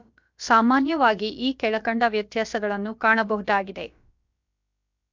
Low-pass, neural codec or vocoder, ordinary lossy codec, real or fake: 7.2 kHz; codec, 16 kHz, about 1 kbps, DyCAST, with the encoder's durations; MP3, 64 kbps; fake